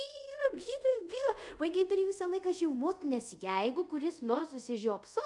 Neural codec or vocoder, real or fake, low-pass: codec, 24 kHz, 0.5 kbps, DualCodec; fake; 10.8 kHz